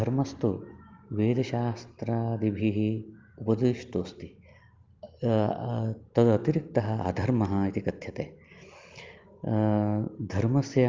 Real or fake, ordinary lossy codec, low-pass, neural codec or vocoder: real; Opus, 32 kbps; 7.2 kHz; none